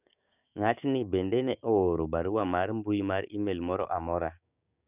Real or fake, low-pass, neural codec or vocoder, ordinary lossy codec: fake; 3.6 kHz; codec, 16 kHz, 6 kbps, DAC; none